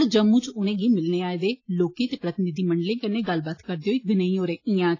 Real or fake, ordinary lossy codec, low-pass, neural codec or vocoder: real; AAC, 32 kbps; 7.2 kHz; none